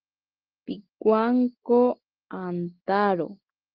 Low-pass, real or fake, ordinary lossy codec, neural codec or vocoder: 5.4 kHz; real; Opus, 16 kbps; none